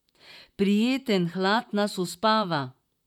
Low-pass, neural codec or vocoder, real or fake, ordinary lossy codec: 19.8 kHz; vocoder, 44.1 kHz, 128 mel bands, Pupu-Vocoder; fake; none